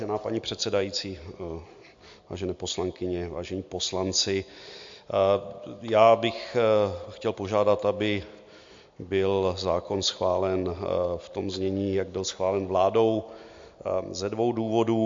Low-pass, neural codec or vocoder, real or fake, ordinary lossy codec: 7.2 kHz; none; real; MP3, 48 kbps